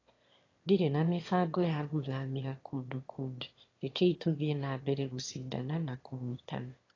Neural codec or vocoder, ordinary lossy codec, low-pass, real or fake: autoencoder, 22.05 kHz, a latent of 192 numbers a frame, VITS, trained on one speaker; AAC, 32 kbps; 7.2 kHz; fake